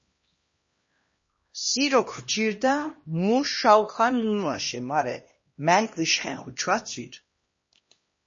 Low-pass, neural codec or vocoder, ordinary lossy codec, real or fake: 7.2 kHz; codec, 16 kHz, 1 kbps, X-Codec, HuBERT features, trained on LibriSpeech; MP3, 32 kbps; fake